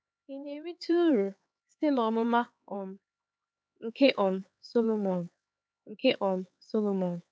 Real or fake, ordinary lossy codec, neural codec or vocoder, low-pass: fake; none; codec, 16 kHz, 4 kbps, X-Codec, HuBERT features, trained on LibriSpeech; none